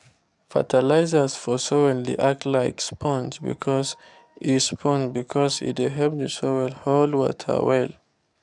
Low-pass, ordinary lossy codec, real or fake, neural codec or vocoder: 10.8 kHz; none; fake; codec, 44.1 kHz, 7.8 kbps, DAC